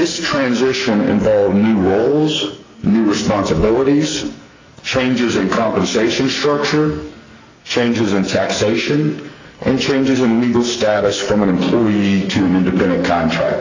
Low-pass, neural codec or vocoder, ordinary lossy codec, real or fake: 7.2 kHz; codec, 44.1 kHz, 2.6 kbps, SNAC; AAC, 32 kbps; fake